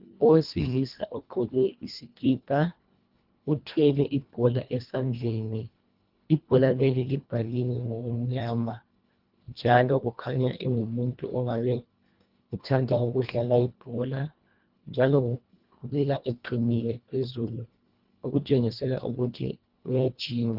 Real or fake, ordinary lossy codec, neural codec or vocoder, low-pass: fake; Opus, 32 kbps; codec, 24 kHz, 1.5 kbps, HILCodec; 5.4 kHz